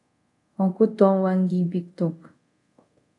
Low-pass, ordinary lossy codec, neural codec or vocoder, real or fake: 10.8 kHz; AAC, 48 kbps; codec, 24 kHz, 0.5 kbps, DualCodec; fake